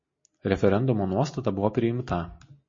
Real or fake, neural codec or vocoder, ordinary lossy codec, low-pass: real; none; MP3, 32 kbps; 7.2 kHz